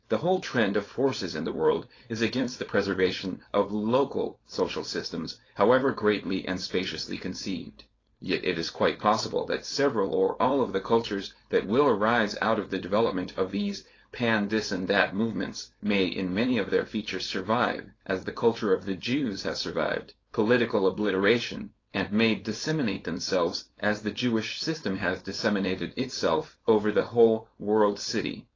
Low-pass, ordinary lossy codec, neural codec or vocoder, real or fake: 7.2 kHz; AAC, 32 kbps; codec, 16 kHz, 4.8 kbps, FACodec; fake